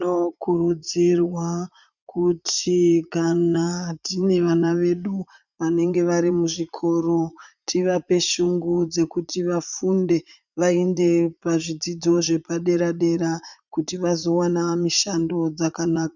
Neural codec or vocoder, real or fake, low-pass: vocoder, 44.1 kHz, 80 mel bands, Vocos; fake; 7.2 kHz